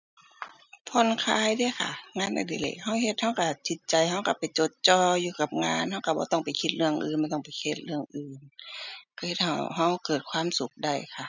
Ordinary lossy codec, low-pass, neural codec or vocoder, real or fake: none; 7.2 kHz; none; real